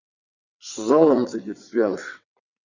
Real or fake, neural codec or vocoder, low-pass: fake; vocoder, 22.05 kHz, 80 mel bands, WaveNeXt; 7.2 kHz